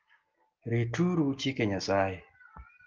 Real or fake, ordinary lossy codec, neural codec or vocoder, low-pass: real; Opus, 24 kbps; none; 7.2 kHz